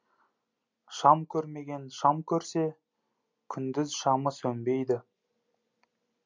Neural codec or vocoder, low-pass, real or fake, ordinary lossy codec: none; 7.2 kHz; real; MP3, 64 kbps